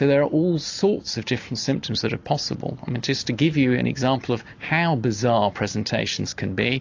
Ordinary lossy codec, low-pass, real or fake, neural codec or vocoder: AAC, 48 kbps; 7.2 kHz; real; none